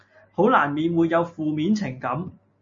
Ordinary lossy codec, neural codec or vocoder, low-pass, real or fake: MP3, 32 kbps; none; 7.2 kHz; real